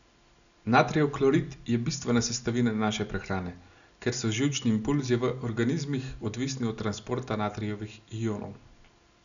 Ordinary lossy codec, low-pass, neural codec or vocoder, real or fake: none; 7.2 kHz; none; real